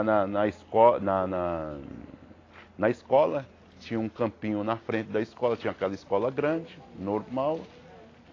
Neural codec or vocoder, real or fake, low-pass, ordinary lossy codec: none; real; 7.2 kHz; AAC, 32 kbps